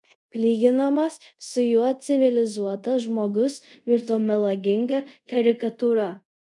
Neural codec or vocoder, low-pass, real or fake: codec, 24 kHz, 0.5 kbps, DualCodec; 10.8 kHz; fake